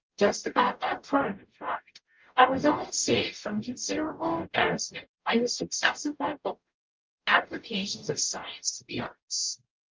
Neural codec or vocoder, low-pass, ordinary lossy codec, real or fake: codec, 44.1 kHz, 0.9 kbps, DAC; 7.2 kHz; Opus, 24 kbps; fake